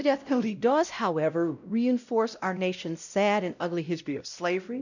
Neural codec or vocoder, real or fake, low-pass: codec, 16 kHz, 0.5 kbps, X-Codec, WavLM features, trained on Multilingual LibriSpeech; fake; 7.2 kHz